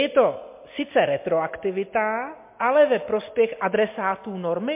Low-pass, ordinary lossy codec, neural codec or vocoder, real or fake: 3.6 kHz; MP3, 24 kbps; none; real